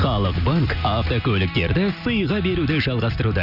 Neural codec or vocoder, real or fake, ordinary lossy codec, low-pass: none; real; none; 5.4 kHz